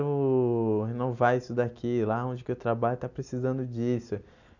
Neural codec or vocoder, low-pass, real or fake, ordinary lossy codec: none; 7.2 kHz; real; none